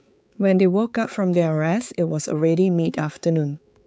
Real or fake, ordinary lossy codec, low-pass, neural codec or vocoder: fake; none; none; codec, 16 kHz, 4 kbps, X-Codec, HuBERT features, trained on balanced general audio